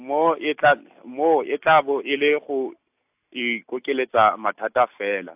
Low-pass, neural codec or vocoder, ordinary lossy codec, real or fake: 3.6 kHz; none; AAC, 32 kbps; real